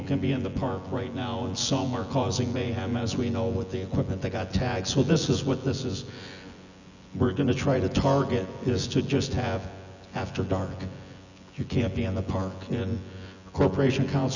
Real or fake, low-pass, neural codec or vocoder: fake; 7.2 kHz; vocoder, 24 kHz, 100 mel bands, Vocos